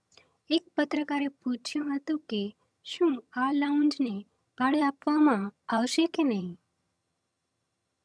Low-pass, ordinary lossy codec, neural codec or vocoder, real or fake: none; none; vocoder, 22.05 kHz, 80 mel bands, HiFi-GAN; fake